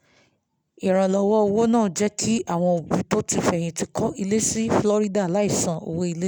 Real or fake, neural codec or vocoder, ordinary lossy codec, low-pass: real; none; none; none